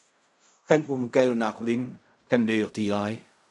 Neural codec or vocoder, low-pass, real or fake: codec, 16 kHz in and 24 kHz out, 0.4 kbps, LongCat-Audio-Codec, fine tuned four codebook decoder; 10.8 kHz; fake